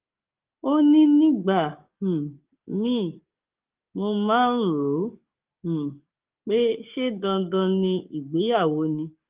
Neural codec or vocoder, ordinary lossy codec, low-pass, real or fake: codec, 44.1 kHz, 7.8 kbps, DAC; Opus, 32 kbps; 3.6 kHz; fake